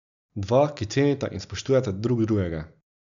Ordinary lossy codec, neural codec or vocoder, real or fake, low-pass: none; none; real; 7.2 kHz